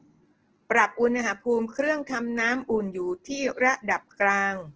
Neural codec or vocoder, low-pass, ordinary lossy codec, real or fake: none; 7.2 kHz; Opus, 16 kbps; real